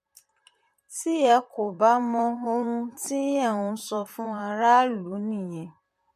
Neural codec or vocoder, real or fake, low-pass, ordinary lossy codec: vocoder, 44.1 kHz, 128 mel bands, Pupu-Vocoder; fake; 14.4 kHz; MP3, 64 kbps